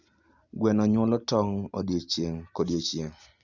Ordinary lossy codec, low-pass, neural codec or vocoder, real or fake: Opus, 64 kbps; 7.2 kHz; none; real